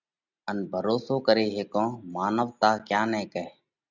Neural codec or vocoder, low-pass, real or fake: none; 7.2 kHz; real